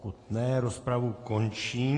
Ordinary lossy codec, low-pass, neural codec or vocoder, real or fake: AAC, 32 kbps; 10.8 kHz; none; real